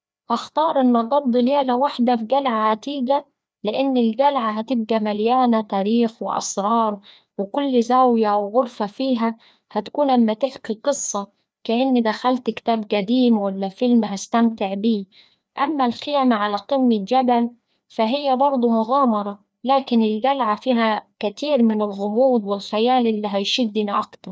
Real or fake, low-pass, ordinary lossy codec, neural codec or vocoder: fake; none; none; codec, 16 kHz, 2 kbps, FreqCodec, larger model